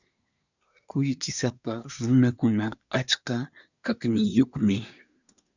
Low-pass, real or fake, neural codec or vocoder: 7.2 kHz; fake; codec, 24 kHz, 1 kbps, SNAC